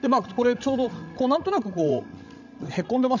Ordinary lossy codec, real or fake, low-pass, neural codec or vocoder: none; fake; 7.2 kHz; codec, 16 kHz, 16 kbps, FreqCodec, larger model